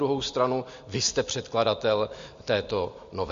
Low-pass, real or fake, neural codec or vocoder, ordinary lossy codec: 7.2 kHz; real; none; MP3, 48 kbps